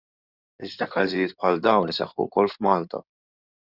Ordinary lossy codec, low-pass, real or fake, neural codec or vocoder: Opus, 64 kbps; 5.4 kHz; fake; codec, 16 kHz in and 24 kHz out, 2.2 kbps, FireRedTTS-2 codec